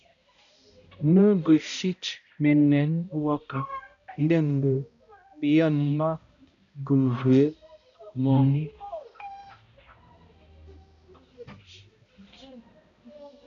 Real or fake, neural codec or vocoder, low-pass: fake; codec, 16 kHz, 0.5 kbps, X-Codec, HuBERT features, trained on balanced general audio; 7.2 kHz